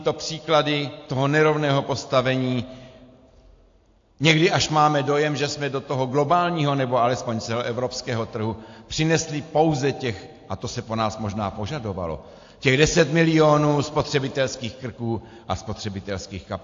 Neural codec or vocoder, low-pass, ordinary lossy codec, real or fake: none; 7.2 kHz; AAC, 48 kbps; real